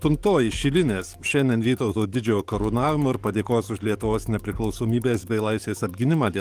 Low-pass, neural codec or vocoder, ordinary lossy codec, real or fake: 14.4 kHz; codec, 44.1 kHz, 7.8 kbps, DAC; Opus, 32 kbps; fake